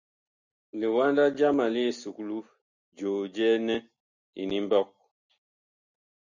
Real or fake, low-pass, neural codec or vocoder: real; 7.2 kHz; none